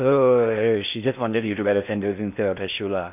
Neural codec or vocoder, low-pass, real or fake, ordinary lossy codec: codec, 16 kHz in and 24 kHz out, 0.6 kbps, FocalCodec, streaming, 4096 codes; 3.6 kHz; fake; none